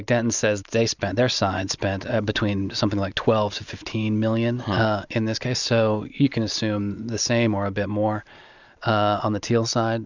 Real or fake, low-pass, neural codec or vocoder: real; 7.2 kHz; none